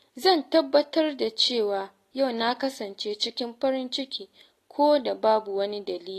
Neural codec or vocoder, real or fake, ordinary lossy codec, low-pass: none; real; AAC, 48 kbps; 14.4 kHz